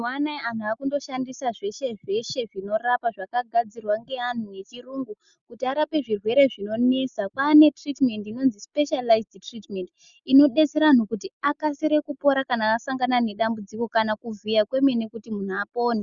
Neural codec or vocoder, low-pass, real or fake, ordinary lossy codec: none; 7.2 kHz; real; Opus, 64 kbps